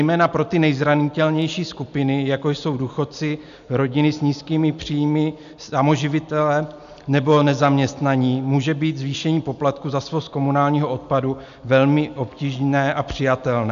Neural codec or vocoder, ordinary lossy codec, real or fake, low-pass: none; AAC, 96 kbps; real; 7.2 kHz